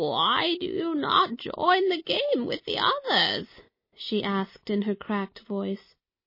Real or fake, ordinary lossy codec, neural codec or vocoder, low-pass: real; MP3, 24 kbps; none; 5.4 kHz